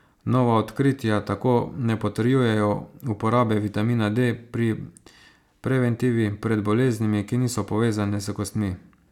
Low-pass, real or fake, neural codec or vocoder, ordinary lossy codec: 19.8 kHz; real; none; none